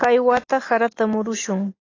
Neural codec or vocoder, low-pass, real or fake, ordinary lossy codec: none; 7.2 kHz; real; AAC, 32 kbps